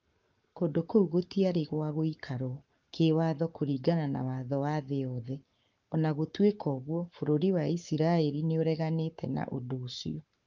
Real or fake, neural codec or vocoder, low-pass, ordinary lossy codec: fake; codec, 44.1 kHz, 7.8 kbps, Pupu-Codec; 7.2 kHz; Opus, 24 kbps